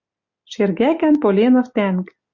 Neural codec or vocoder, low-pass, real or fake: none; 7.2 kHz; real